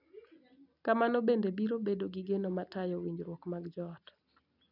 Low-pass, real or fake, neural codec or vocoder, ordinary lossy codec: 5.4 kHz; real; none; none